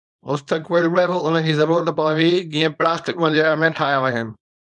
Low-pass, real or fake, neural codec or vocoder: 10.8 kHz; fake; codec, 24 kHz, 0.9 kbps, WavTokenizer, small release